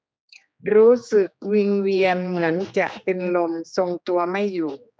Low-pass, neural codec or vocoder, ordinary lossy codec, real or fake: none; codec, 16 kHz, 2 kbps, X-Codec, HuBERT features, trained on general audio; none; fake